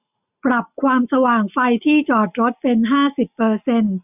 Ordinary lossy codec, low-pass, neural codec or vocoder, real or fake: none; 3.6 kHz; none; real